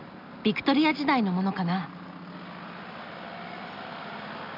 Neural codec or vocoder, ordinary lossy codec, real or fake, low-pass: none; none; real; 5.4 kHz